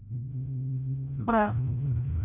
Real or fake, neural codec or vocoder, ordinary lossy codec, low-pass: fake; codec, 16 kHz, 0.5 kbps, FreqCodec, larger model; AAC, 24 kbps; 3.6 kHz